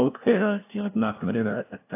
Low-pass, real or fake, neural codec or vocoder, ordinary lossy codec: 3.6 kHz; fake; codec, 16 kHz, 0.5 kbps, FunCodec, trained on LibriTTS, 25 frames a second; AAC, 24 kbps